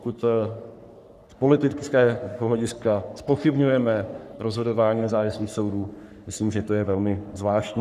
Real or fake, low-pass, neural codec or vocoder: fake; 14.4 kHz; codec, 44.1 kHz, 3.4 kbps, Pupu-Codec